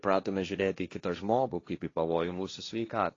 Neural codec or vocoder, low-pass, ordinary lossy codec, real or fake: codec, 16 kHz, 1.1 kbps, Voila-Tokenizer; 7.2 kHz; AAC, 32 kbps; fake